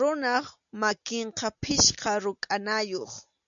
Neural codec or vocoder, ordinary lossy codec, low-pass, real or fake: none; MP3, 96 kbps; 7.2 kHz; real